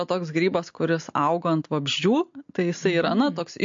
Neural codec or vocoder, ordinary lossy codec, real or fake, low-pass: none; MP3, 48 kbps; real; 7.2 kHz